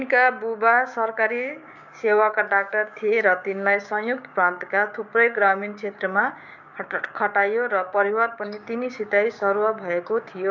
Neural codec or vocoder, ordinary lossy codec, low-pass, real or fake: codec, 16 kHz, 6 kbps, DAC; none; 7.2 kHz; fake